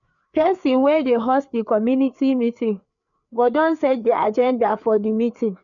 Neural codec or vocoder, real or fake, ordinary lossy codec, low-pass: codec, 16 kHz, 4 kbps, FreqCodec, larger model; fake; none; 7.2 kHz